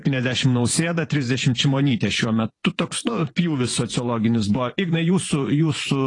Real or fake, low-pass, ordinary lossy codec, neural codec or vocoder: fake; 10.8 kHz; AAC, 32 kbps; vocoder, 24 kHz, 100 mel bands, Vocos